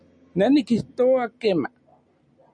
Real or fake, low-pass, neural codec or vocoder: real; 9.9 kHz; none